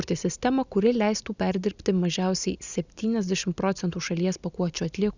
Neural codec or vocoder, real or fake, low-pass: none; real; 7.2 kHz